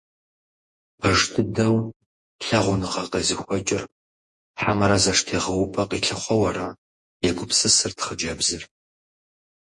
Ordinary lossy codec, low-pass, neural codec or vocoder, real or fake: MP3, 48 kbps; 10.8 kHz; vocoder, 48 kHz, 128 mel bands, Vocos; fake